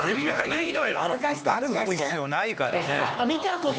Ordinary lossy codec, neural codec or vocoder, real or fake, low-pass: none; codec, 16 kHz, 2 kbps, X-Codec, HuBERT features, trained on LibriSpeech; fake; none